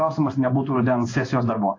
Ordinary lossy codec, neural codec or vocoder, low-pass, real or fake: AAC, 32 kbps; codec, 16 kHz in and 24 kHz out, 1 kbps, XY-Tokenizer; 7.2 kHz; fake